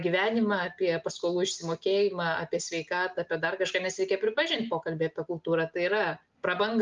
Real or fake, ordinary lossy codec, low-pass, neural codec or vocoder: real; Opus, 32 kbps; 7.2 kHz; none